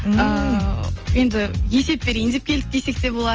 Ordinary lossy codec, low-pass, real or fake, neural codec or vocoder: Opus, 24 kbps; 7.2 kHz; real; none